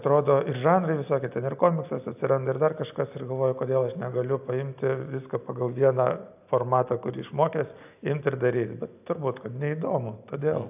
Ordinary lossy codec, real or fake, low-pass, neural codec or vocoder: AAC, 32 kbps; real; 3.6 kHz; none